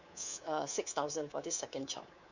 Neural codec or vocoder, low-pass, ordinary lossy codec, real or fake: codec, 24 kHz, 3.1 kbps, DualCodec; 7.2 kHz; none; fake